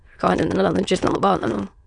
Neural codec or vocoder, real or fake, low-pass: autoencoder, 22.05 kHz, a latent of 192 numbers a frame, VITS, trained on many speakers; fake; 9.9 kHz